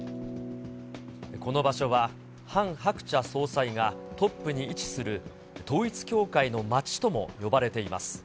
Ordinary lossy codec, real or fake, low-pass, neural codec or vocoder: none; real; none; none